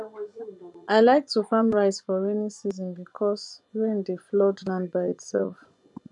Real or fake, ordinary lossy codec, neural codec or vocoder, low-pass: fake; MP3, 96 kbps; vocoder, 24 kHz, 100 mel bands, Vocos; 10.8 kHz